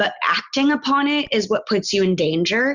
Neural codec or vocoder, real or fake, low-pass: none; real; 7.2 kHz